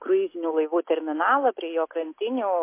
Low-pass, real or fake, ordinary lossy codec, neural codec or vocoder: 3.6 kHz; real; MP3, 24 kbps; none